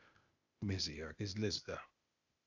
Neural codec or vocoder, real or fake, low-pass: codec, 16 kHz, 0.8 kbps, ZipCodec; fake; 7.2 kHz